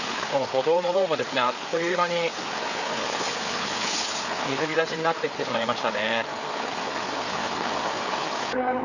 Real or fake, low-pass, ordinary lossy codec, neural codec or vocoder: fake; 7.2 kHz; none; codec, 16 kHz, 4 kbps, FreqCodec, larger model